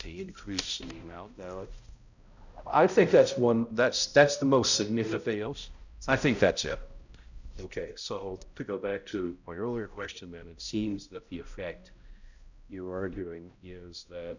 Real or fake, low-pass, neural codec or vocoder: fake; 7.2 kHz; codec, 16 kHz, 0.5 kbps, X-Codec, HuBERT features, trained on balanced general audio